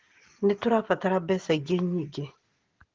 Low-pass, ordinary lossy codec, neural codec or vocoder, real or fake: 7.2 kHz; Opus, 16 kbps; none; real